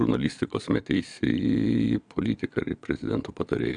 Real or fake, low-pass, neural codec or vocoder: fake; 9.9 kHz; vocoder, 22.05 kHz, 80 mel bands, Vocos